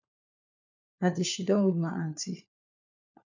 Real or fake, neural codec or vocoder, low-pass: fake; codec, 16 kHz, 4 kbps, FunCodec, trained on LibriTTS, 50 frames a second; 7.2 kHz